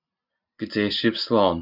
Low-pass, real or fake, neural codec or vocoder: 5.4 kHz; real; none